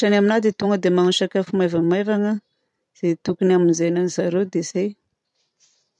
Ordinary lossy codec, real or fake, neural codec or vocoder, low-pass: none; fake; vocoder, 44.1 kHz, 128 mel bands every 512 samples, BigVGAN v2; 10.8 kHz